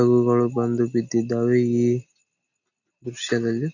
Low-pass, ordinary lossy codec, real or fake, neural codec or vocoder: 7.2 kHz; none; real; none